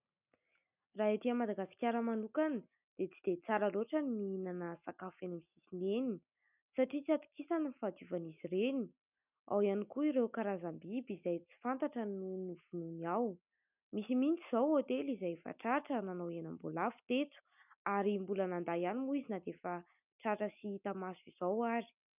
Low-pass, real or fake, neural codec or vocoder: 3.6 kHz; real; none